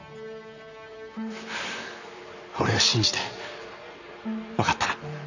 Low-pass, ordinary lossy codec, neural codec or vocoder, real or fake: 7.2 kHz; none; vocoder, 44.1 kHz, 128 mel bands, Pupu-Vocoder; fake